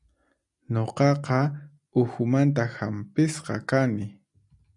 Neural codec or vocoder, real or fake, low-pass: none; real; 10.8 kHz